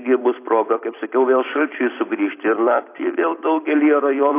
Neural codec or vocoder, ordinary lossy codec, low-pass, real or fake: vocoder, 44.1 kHz, 128 mel bands every 256 samples, BigVGAN v2; AAC, 24 kbps; 3.6 kHz; fake